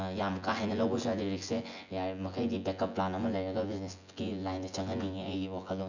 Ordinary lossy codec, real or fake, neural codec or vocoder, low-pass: none; fake; vocoder, 24 kHz, 100 mel bands, Vocos; 7.2 kHz